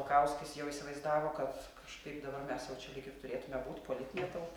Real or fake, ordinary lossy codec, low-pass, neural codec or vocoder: real; Opus, 64 kbps; 19.8 kHz; none